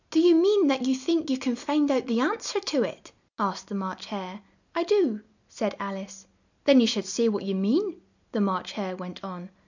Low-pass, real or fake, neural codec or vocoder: 7.2 kHz; real; none